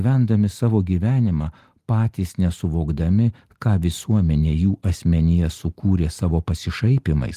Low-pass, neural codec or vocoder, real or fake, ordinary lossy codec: 14.4 kHz; none; real; Opus, 24 kbps